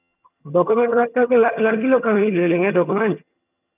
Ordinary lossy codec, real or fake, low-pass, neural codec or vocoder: none; fake; 3.6 kHz; vocoder, 22.05 kHz, 80 mel bands, HiFi-GAN